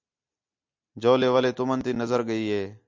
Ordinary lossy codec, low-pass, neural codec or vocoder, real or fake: MP3, 64 kbps; 7.2 kHz; none; real